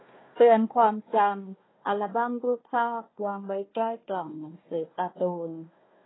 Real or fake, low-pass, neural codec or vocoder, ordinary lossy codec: fake; 7.2 kHz; codec, 16 kHz, 1 kbps, FunCodec, trained on Chinese and English, 50 frames a second; AAC, 16 kbps